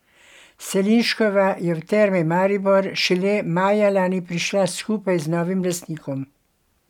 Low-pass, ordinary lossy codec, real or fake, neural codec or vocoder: 19.8 kHz; none; real; none